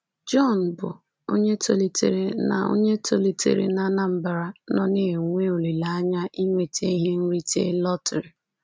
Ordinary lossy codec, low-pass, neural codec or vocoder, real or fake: none; none; none; real